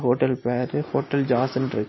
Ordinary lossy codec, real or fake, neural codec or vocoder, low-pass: MP3, 24 kbps; real; none; 7.2 kHz